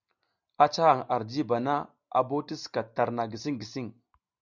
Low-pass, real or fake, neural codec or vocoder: 7.2 kHz; real; none